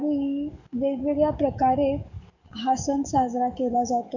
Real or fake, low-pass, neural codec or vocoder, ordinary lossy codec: fake; 7.2 kHz; codec, 44.1 kHz, 7.8 kbps, DAC; none